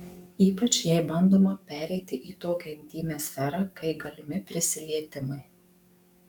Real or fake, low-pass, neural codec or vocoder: fake; 19.8 kHz; codec, 44.1 kHz, 7.8 kbps, DAC